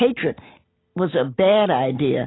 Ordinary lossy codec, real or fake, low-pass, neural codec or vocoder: AAC, 16 kbps; real; 7.2 kHz; none